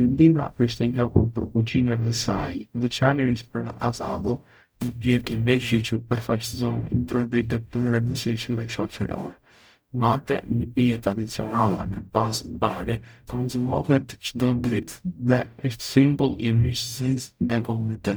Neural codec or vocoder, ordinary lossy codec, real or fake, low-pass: codec, 44.1 kHz, 0.9 kbps, DAC; none; fake; none